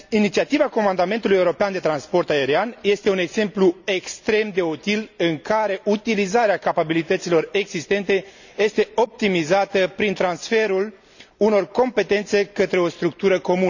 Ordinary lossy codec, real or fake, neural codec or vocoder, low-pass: none; real; none; 7.2 kHz